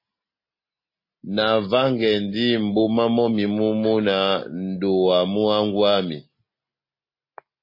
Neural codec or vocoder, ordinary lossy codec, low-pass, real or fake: none; MP3, 24 kbps; 5.4 kHz; real